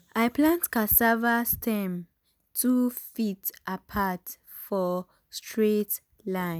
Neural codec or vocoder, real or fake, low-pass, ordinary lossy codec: none; real; none; none